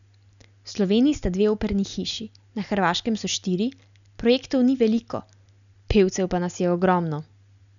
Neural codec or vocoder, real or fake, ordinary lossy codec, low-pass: none; real; none; 7.2 kHz